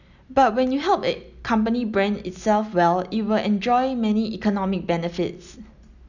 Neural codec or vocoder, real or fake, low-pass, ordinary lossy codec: none; real; 7.2 kHz; none